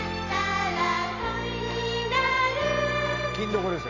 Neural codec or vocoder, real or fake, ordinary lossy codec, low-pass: none; real; none; 7.2 kHz